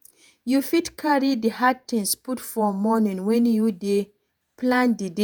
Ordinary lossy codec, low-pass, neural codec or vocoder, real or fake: none; none; vocoder, 48 kHz, 128 mel bands, Vocos; fake